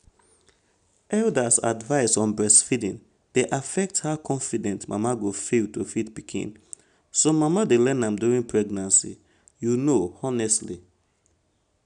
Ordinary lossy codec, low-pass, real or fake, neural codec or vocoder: none; 9.9 kHz; real; none